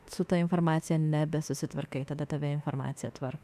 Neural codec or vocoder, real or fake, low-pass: autoencoder, 48 kHz, 32 numbers a frame, DAC-VAE, trained on Japanese speech; fake; 14.4 kHz